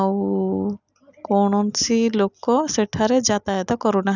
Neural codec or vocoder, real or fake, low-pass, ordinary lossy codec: none; real; 7.2 kHz; none